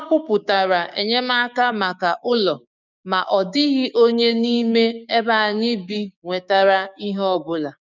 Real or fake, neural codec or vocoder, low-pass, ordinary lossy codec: fake; codec, 16 kHz, 6 kbps, DAC; 7.2 kHz; none